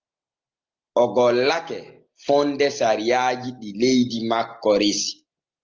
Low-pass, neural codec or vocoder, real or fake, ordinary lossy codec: 7.2 kHz; none; real; Opus, 24 kbps